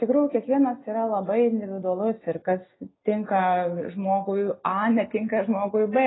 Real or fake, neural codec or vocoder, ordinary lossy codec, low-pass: real; none; AAC, 16 kbps; 7.2 kHz